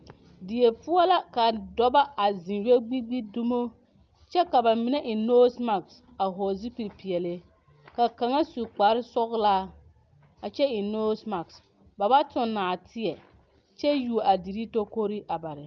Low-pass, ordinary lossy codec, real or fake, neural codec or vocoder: 7.2 kHz; Opus, 24 kbps; real; none